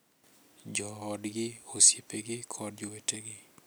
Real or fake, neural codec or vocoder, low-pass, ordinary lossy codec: real; none; none; none